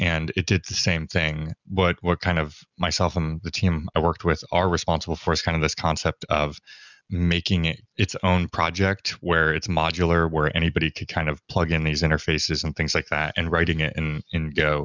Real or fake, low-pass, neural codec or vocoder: real; 7.2 kHz; none